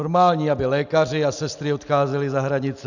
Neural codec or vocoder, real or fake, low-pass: none; real; 7.2 kHz